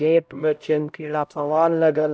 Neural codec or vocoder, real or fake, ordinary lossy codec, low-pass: codec, 16 kHz, 0.5 kbps, X-Codec, HuBERT features, trained on LibriSpeech; fake; none; none